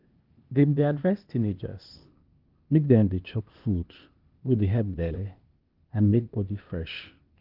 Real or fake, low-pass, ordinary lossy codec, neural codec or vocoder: fake; 5.4 kHz; Opus, 32 kbps; codec, 16 kHz, 0.8 kbps, ZipCodec